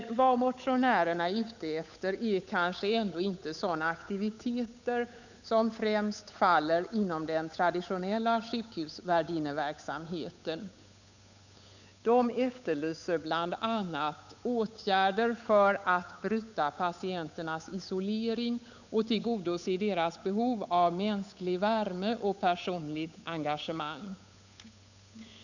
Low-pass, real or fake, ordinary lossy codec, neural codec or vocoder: 7.2 kHz; fake; none; codec, 16 kHz, 8 kbps, FunCodec, trained on Chinese and English, 25 frames a second